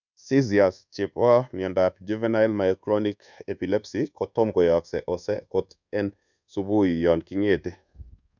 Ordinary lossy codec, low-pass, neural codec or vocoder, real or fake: none; 7.2 kHz; codec, 24 kHz, 1.2 kbps, DualCodec; fake